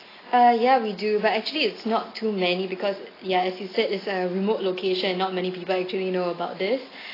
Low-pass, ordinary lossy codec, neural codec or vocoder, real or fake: 5.4 kHz; AAC, 24 kbps; none; real